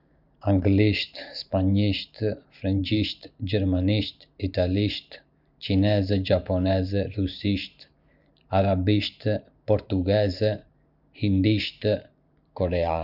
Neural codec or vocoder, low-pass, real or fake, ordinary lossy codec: vocoder, 44.1 kHz, 128 mel bands every 512 samples, BigVGAN v2; 5.4 kHz; fake; none